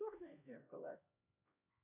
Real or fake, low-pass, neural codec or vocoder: fake; 3.6 kHz; codec, 16 kHz, 1 kbps, X-Codec, HuBERT features, trained on LibriSpeech